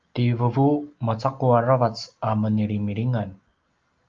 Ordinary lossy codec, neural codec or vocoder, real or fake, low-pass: Opus, 32 kbps; none; real; 7.2 kHz